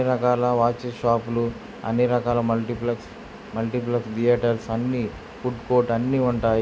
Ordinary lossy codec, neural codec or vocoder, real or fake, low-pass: none; none; real; none